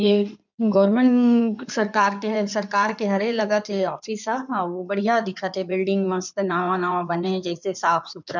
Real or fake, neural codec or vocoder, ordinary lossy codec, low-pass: fake; codec, 16 kHz in and 24 kHz out, 2.2 kbps, FireRedTTS-2 codec; none; 7.2 kHz